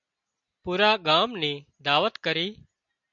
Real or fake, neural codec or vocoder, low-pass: real; none; 7.2 kHz